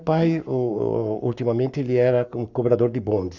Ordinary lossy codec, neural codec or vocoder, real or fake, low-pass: none; codec, 44.1 kHz, 7.8 kbps, Pupu-Codec; fake; 7.2 kHz